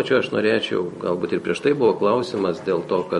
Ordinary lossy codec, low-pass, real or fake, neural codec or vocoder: MP3, 48 kbps; 19.8 kHz; fake; vocoder, 44.1 kHz, 128 mel bands every 256 samples, BigVGAN v2